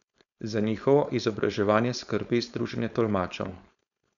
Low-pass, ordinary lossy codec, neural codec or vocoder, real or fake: 7.2 kHz; none; codec, 16 kHz, 4.8 kbps, FACodec; fake